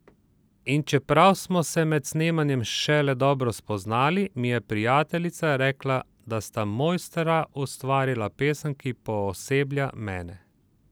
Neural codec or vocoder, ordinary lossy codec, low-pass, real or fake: none; none; none; real